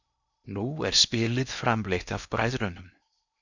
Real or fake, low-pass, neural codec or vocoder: fake; 7.2 kHz; codec, 16 kHz in and 24 kHz out, 0.8 kbps, FocalCodec, streaming, 65536 codes